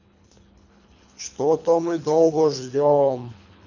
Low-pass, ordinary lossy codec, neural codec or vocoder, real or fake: 7.2 kHz; none; codec, 24 kHz, 3 kbps, HILCodec; fake